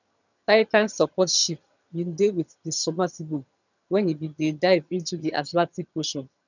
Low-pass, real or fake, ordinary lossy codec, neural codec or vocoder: 7.2 kHz; fake; none; vocoder, 22.05 kHz, 80 mel bands, HiFi-GAN